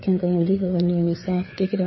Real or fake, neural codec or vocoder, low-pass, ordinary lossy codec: fake; codec, 16 kHz, 4 kbps, FreqCodec, larger model; 7.2 kHz; MP3, 24 kbps